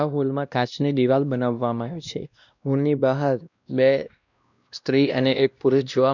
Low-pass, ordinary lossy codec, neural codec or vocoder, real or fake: 7.2 kHz; none; codec, 16 kHz, 1 kbps, X-Codec, WavLM features, trained on Multilingual LibriSpeech; fake